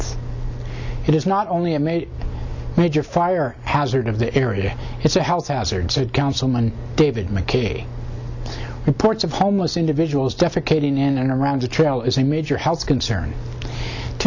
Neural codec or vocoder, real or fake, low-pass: none; real; 7.2 kHz